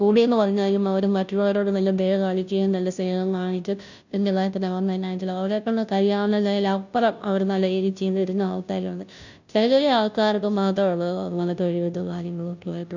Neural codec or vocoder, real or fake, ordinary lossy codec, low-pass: codec, 16 kHz, 0.5 kbps, FunCodec, trained on Chinese and English, 25 frames a second; fake; none; 7.2 kHz